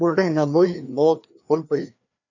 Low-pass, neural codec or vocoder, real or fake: 7.2 kHz; codec, 16 kHz, 2 kbps, FreqCodec, larger model; fake